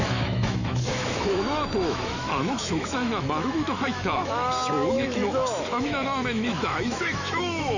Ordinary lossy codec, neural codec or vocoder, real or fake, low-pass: none; autoencoder, 48 kHz, 128 numbers a frame, DAC-VAE, trained on Japanese speech; fake; 7.2 kHz